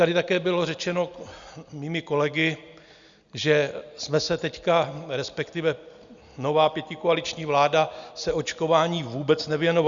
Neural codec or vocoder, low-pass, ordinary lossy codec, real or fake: none; 7.2 kHz; Opus, 64 kbps; real